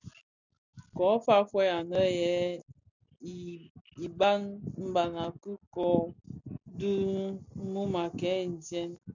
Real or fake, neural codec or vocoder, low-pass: real; none; 7.2 kHz